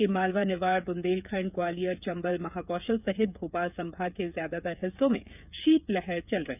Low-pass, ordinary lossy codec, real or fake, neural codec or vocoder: 3.6 kHz; none; fake; codec, 16 kHz, 8 kbps, FreqCodec, smaller model